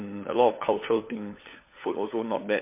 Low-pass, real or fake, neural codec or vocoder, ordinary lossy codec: 3.6 kHz; fake; codec, 16 kHz, 4 kbps, FunCodec, trained on LibriTTS, 50 frames a second; MP3, 24 kbps